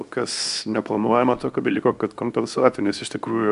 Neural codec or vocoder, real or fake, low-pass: codec, 24 kHz, 0.9 kbps, WavTokenizer, small release; fake; 10.8 kHz